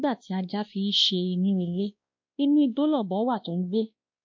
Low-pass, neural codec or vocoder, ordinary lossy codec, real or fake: 7.2 kHz; codec, 16 kHz, 1 kbps, X-Codec, WavLM features, trained on Multilingual LibriSpeech; MP3, 48 kbps; fake